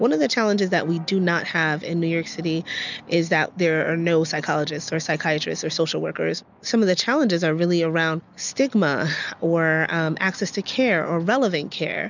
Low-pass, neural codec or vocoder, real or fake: 7.2 kHz; none; real